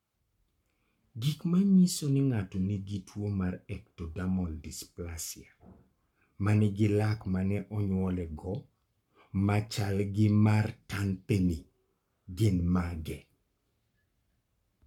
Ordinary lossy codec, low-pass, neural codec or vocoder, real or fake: MP3, 96 kbps; 19.8 kHz; codec, 44.1 kHz, 7.8 kbps, Pupu-Codec; fake